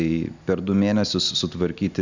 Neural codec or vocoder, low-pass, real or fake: none; 7.2 kHz; real